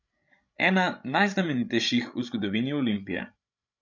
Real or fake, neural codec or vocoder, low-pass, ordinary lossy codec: fake; codec, 16 kHz, 16 kbps, FreqCodec, larger model; 7.2 kHz; none